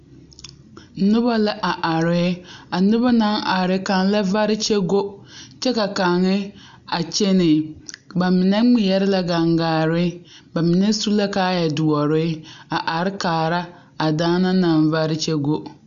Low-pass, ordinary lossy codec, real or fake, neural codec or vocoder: 7.2 kHz; MP3, 96 kbps; real; none